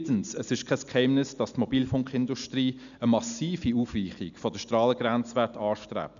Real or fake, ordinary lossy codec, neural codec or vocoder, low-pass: real; none; none; 7.2 kHz